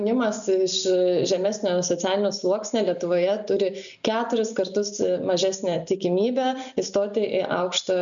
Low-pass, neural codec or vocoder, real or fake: 7.2 kHz; none; real